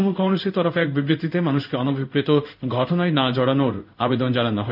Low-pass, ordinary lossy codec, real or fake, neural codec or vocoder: 5.4 kHz; none; fake; codec, 16 kHz in and 24 kHz out, 1 kbps, XY-Tokenizer